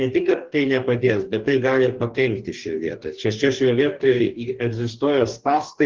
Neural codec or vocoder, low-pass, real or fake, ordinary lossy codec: codec, 44.1 kHz, 2.6 kbps, DAC; 7.2 kHz; fake; Opus, 16 kbps